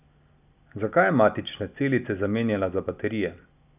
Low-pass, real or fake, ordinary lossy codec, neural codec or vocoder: 3.6 kHz; real; none; none